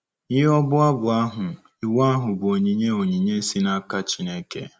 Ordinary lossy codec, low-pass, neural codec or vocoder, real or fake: none; none; none; real